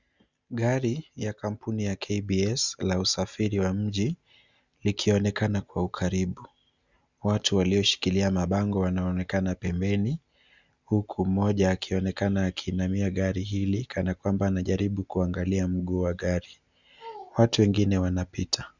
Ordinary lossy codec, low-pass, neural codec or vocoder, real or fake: Opus, 64 kbps; 7.2 kHz; none; real